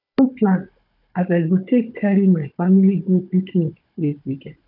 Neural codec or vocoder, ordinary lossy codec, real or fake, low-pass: codec, 16 kHz, 16 kbps, FunCodec, trained on Chinese and English, 50 frames a second; none; fake; 5.4 kHz